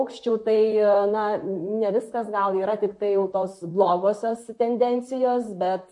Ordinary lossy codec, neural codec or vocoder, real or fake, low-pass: MP3, 48 kbps; vocoder, 44.1 kHz, 128 mel bands, Pupu-Vocoder; fake; 10.8 kHz